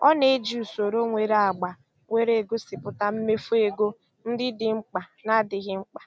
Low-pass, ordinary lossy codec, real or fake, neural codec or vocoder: none; none; real; none